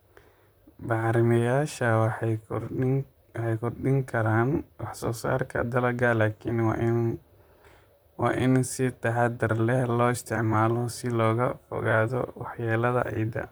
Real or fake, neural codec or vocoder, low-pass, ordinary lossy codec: fake; vocoder, 44.1 kHz, 128 mel bands, Pupu-Vocoder; none; none